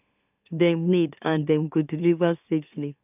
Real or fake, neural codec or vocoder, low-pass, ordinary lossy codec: fake; autoencoder, 44.1 kHz, a latent of 192 numbers a frame, MeloTTS; 3.6 kHz; none